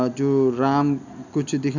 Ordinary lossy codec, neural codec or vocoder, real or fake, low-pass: none; none; real; 7.2 kHz